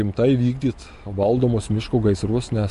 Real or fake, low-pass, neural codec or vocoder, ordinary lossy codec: real; 14.4 kHz; none; MP3, 48 kbps